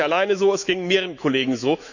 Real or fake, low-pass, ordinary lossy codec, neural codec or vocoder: fake; 7.2 kHz; none; autoencoder, 48 kHz, 128 numbers a frame, DAC-VAE, trained on Japanese speech